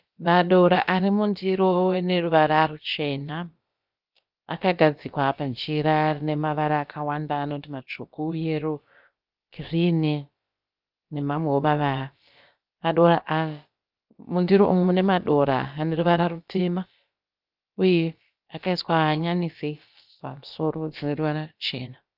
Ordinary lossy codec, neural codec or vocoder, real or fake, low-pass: Opus, 24 kbps; codec, 16 kHz, about 1 kbps, DyCAST, with the encoder's durations; fake; 5.4 kHz